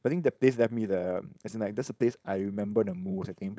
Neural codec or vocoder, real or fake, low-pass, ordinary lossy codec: codec, 16 kHz, 4.8 kbps, FACodec; fake; none; none